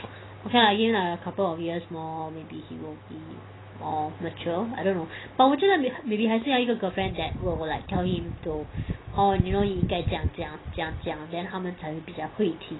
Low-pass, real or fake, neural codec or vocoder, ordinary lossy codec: 7.2 kHz; real; none; AAC, 16 kbps